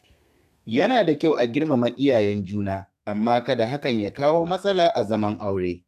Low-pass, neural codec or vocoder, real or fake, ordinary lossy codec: 14.4 kHz; codec, 32 kHz, 1.9 kbps, SNAC; fake; none